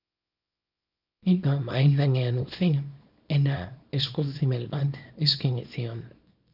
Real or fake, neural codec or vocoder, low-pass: fake; codec, 24 kHz, 0.9 kbps, WavTokenizer, small release; 5.4 kHz